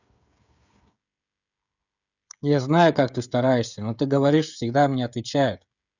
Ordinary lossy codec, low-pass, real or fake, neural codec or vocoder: none; 7.2 kHz; fake; codec, 16 kHz, 16 kbps, FreqCodec, smaller model